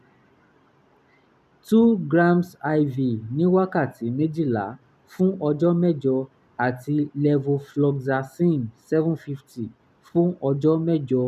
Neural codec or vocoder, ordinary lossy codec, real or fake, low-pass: none; none; real; 14.4 kHz